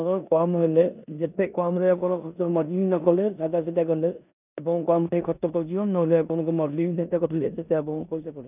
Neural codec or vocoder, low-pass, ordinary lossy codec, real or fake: codec, 16 kHz in and 24 kHz out, 0.9 kbps, LongCat-Audio-Codec, four codebook decoder; 3.6 kHz; none; fake